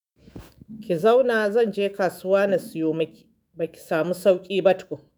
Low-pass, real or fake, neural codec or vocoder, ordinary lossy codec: none; fake; autoencoder, 48 kHz, 128 numbers a frame, DAC-VAE, trained on Japanese speech; none